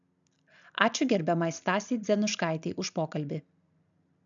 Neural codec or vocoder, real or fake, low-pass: none; real; 7.2 kHz